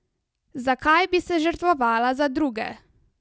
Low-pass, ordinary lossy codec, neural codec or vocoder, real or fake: none; none; none; real